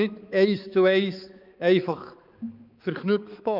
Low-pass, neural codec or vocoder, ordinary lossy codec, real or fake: 5.4 kHz; codec, 16 kHz, 4 kbps, X-Codec, HuBERT features, trained on balanced general audio; Opus, 24 kbps; fake